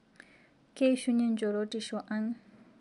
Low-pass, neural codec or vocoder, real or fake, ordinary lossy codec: 10.8 kHz; none; real; MP3, 96 kbps